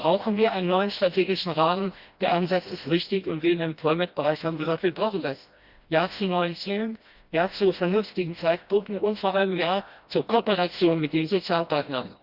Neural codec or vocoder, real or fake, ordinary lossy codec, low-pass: codec, 16 kHz, 1 kbps, FreqCodec, smaller model; fake; Opus, 64 kbps; 5.4 kHz